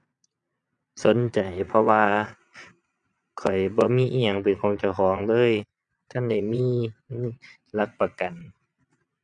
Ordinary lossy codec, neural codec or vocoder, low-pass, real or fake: none; vocoder, 22.05 kHz, 80 mel bands, Vocos; 9.9 kHz; fake